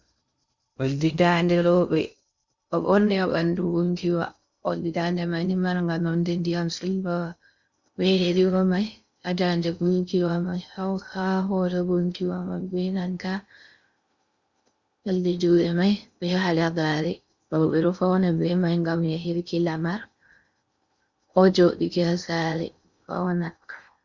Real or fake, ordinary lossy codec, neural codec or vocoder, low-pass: fake; Opus, 64 kbps; codec, 16 kHz in and 24 kHz out, 0.6 kbps, FocalCodec, streaming, 2048 codes; 7.2 kHz